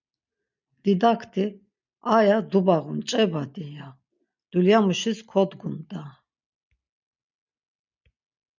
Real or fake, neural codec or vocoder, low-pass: real; none; 7.2 kHz